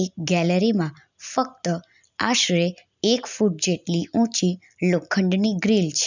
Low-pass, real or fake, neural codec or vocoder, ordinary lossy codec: 7.2 kHz; real; none; none